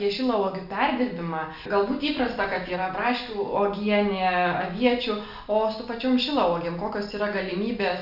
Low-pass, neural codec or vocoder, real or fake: 5.4 kHz; none; real